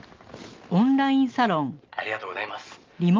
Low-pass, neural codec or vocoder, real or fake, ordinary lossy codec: 7.2 kHz; none; real; Opus, 32 kbps